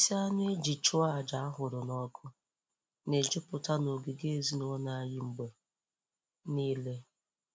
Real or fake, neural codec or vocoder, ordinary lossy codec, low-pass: real; none; none; none